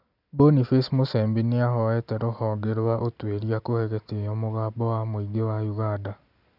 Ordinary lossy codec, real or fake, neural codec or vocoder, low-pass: none; real; none; 5.4 kHz